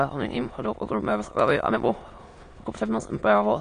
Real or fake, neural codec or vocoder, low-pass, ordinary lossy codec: fake; autoencoder, 22.05 kHz, a latent of 192 numbers a frame, VITS, trained on many speakers; 9.9 kHz; AAC, 48 kbps